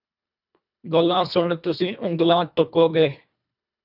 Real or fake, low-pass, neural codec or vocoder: fake; 5.4 kHz; codec, 24 kHz, 1.5 kbps, HILCodec